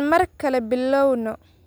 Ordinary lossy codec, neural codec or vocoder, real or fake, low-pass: none; none; real; none